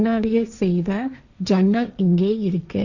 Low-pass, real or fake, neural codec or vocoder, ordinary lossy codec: 7.2 kHz; fake; codec, 16 kHz, 1.1 kbps, Voila-Tokenizer; none